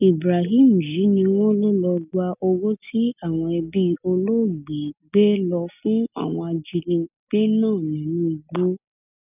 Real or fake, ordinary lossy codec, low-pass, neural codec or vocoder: real; none; 3.6 kHz; none